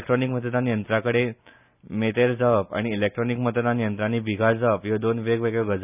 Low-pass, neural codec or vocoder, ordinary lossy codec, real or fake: 3.6 kHz; none; none; real